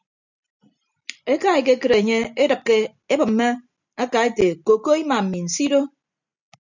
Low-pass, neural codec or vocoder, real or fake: 7.2 kHz; none; real